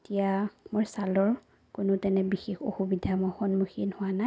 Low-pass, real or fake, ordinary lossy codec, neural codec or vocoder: none; real; none; none